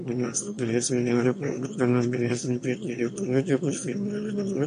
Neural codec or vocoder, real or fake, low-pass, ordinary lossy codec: autoencoder, 22.05 kHz, a latent of 192 numbers a frame, VITS, trained on one speaker; fake; 9.9 kHz; MP3, 48 kbps